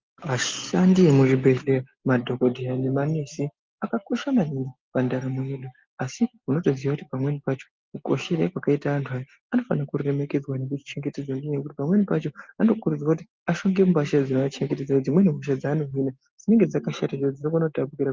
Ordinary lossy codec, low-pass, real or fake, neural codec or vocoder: Opus, 32 kbps; 7.2 kHz; real; none